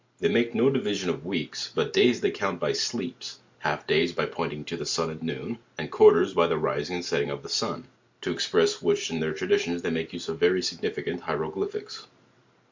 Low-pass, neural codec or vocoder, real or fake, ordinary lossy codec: 7.2 kHz; none; real; MP3, 64 kbps